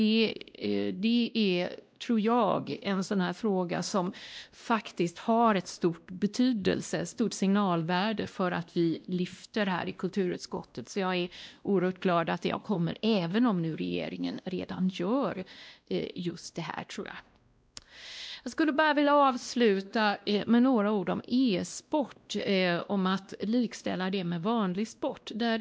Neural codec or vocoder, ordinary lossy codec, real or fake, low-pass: codec, 16 kHz, 1 kbps, X-Codec, WavLM features, trained on Multilingual LibriSpeech; none; fake; none